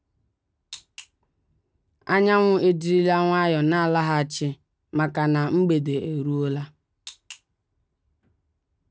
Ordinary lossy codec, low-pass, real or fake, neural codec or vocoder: none; none; real; none